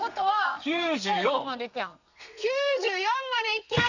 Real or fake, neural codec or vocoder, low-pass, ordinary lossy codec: fake; codec, 32 kHz, 1.9 kbps, SNAC; 7.2 kHz; none